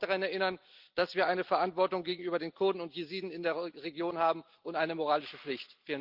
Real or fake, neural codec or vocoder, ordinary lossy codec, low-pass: real; none; Opus, 32 kbps; 5.4 kHz